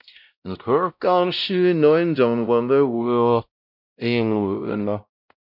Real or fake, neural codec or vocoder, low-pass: fake; codec, 16 kHz, 0.5 kbps, X-Codec, WavLM features, trained on Multilingual LibriSpeech; 5.4 kHz